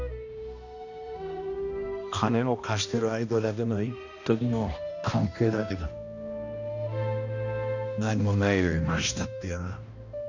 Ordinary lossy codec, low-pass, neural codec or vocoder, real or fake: none; 7.2 kHz; codec, 16 kHz, 1 kbps, X-Codec, HuBERT features, trained on balanced general audio; fake